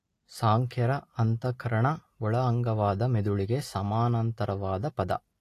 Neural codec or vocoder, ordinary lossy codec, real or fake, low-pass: none; AAC, 48 kbps; real; 14.4 kHz